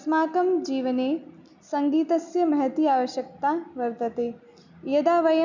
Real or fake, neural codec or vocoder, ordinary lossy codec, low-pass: real; none; none; 7.2 kHz